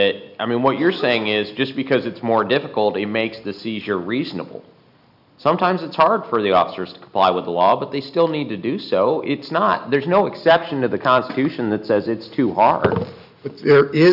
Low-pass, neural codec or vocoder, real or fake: 5.4 kHz; none; real